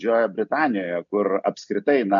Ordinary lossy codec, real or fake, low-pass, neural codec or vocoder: MP3, 96 kbps; real; 7.2 kHz; none